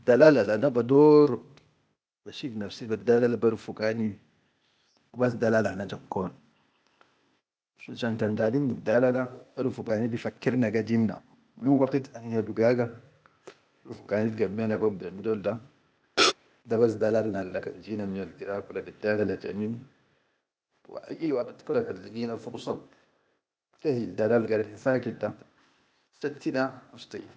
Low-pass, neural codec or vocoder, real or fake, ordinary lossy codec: none; codec, 16 kHz, 0.8 kbps, ZipCodec; fake; none